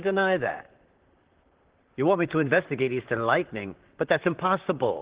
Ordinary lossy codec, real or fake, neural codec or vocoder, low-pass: Opus, 16 kbps; fake; vocoder, 44.1 kHz, 128 mel bands, Pupu-Vocoder; 3.6 kHz